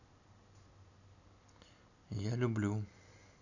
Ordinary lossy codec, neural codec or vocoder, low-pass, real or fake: none; none; 7.2 kHz; real